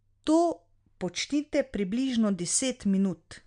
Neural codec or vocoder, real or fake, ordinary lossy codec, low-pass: none; real; MP3, 64 kbps; 9.9 kHz